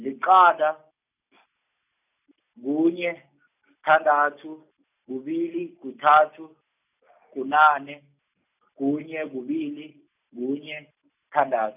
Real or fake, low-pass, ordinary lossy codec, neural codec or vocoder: real; 3.6 kHz; none; none